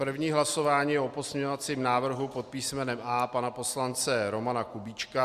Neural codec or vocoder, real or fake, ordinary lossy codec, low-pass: none; real; Opus, 64 kbps; 14.4 kHz